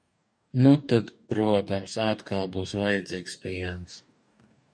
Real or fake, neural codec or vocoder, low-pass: fake; codec, 44.1 kHz, 2.6 kbps, DAC; 9.9 kHz